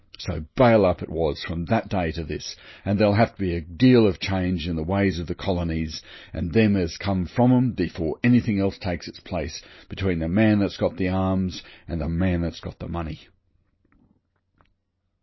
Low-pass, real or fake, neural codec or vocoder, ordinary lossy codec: 7.2 kHz; real; none; MP3, 24 kbps